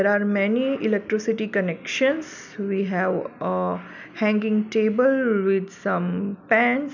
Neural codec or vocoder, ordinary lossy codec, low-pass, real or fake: none; none; 7.2 kHz; real